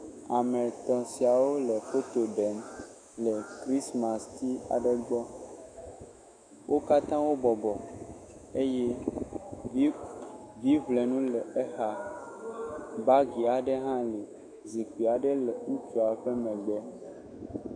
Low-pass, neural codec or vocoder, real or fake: 9.9 kHz; autoencoder, 48 kHz, 128 numbers a frame, DAC-VAE, trained on Japanese speech; fake